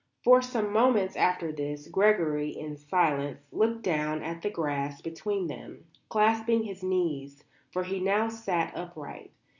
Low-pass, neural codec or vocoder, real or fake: 7.2 kHz; none; real